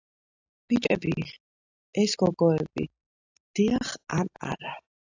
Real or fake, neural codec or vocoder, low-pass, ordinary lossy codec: real; none; 7.2 kHz; AAC, 48 kbps